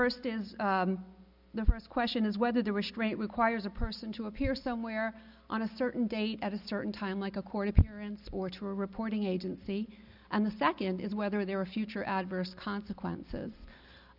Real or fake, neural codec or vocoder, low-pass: real; none; 5.4 kHz